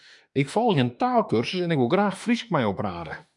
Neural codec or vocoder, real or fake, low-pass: autoencoder, 48 kHz, 32 numbers a frame, DAC-VAE, trained on Japanese speech; fake; 10.8 kHz